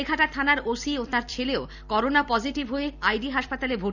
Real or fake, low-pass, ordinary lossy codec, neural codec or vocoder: fake; 7.2 kHz; none; vocoder, 44.1 kHz, 128 mel bands every 256 samples, BigVGAN v2